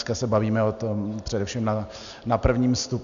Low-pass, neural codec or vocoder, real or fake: 7.2 kHz; none; real